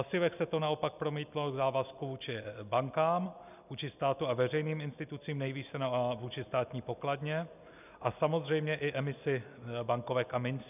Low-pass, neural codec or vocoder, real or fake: 3.6 kHz; none; real